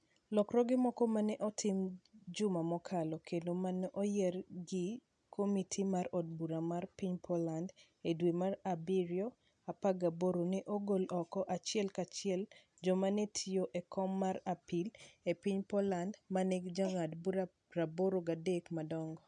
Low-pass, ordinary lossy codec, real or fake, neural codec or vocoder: 9.9 kHz; none; real; none